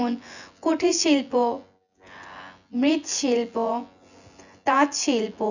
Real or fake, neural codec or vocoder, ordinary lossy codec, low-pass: fake; vocoder, 24 kHz, 100 mel bands, Vocos; none; 7.2 kHz